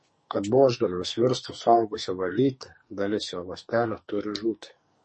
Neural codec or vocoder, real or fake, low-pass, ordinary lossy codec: codec, 44.1 kHz, 2.6 kbps, SNAC; fake; 10.8 kHz; MP3, 32 kbps